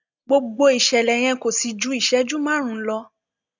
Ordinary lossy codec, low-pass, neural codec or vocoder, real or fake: none; 7.2 kHz; none; real